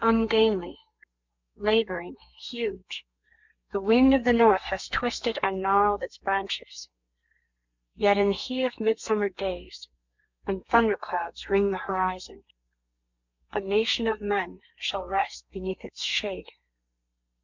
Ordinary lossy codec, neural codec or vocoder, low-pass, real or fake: MP3, 64 kbps; codec, 32 kHz, 1.9 kbps, SNAC; 7.2 kHz; fake